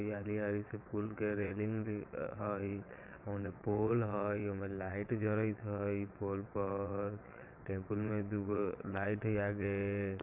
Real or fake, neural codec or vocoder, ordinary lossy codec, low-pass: fake; vocoder, 22.05 kHz, 80 mel bands, WaveNeXt; none; 3.6 kHz